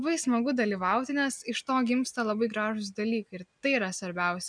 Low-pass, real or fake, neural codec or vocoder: 9.9 kHz; real; none